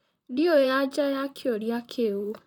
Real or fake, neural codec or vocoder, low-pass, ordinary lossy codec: fake; vocoder, 44.1 kHz, 128 mel bands, Pupu-Vocoder; 19.8 kHz; none